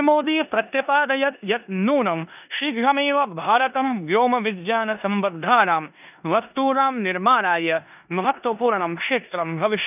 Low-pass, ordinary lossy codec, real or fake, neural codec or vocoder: 3.6 kHz; none; fake; codec, 16 kHz in and 24 kHz out, 0.9 kbps, LongCat-Audio-Codec, four codebook decoder